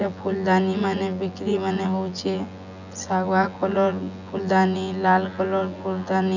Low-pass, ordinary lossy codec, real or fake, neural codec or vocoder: 7.2 kHz; none; fake; vocoder, 24 kHz, 100 mel bands, Vocos